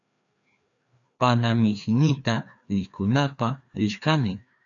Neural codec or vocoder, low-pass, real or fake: codec, 16 kHz, 2 kbps, FreqCodec, larger model; 7.2 kHz; fake